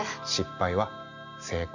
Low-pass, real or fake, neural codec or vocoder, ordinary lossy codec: 7.2 kHz; real; none; none